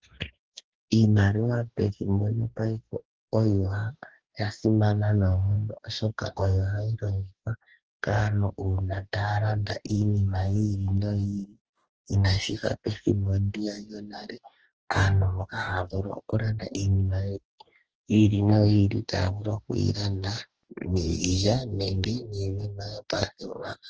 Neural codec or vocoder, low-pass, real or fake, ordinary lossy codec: codec, 44.1 kHz, 2.6 kbps, DAC; 7.2 kHz; fake; Opus, 16 kbps